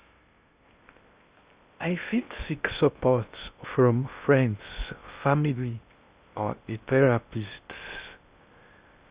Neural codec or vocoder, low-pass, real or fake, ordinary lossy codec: codec, 16 kHz in and 24 kHz out, 0.6 kbps, FocalCodec, streaming, 2048 codes; 3.6 kHz; fake; Opus, 64 kbps